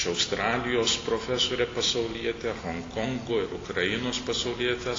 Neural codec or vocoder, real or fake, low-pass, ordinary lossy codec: none; real; 7.2 kHz; AAC, 32 kbps